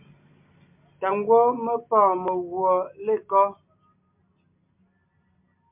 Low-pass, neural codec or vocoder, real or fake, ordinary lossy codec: 3.6 kHz; none; real; MP3, 32 kbps